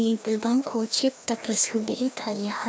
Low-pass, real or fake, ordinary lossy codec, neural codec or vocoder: none; fake; none; codec, 16 kHz, 1 kbps, FreqCodec, larger model